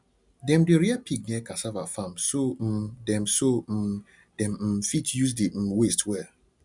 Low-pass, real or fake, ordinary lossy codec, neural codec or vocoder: 10.8 kHz; real; none; none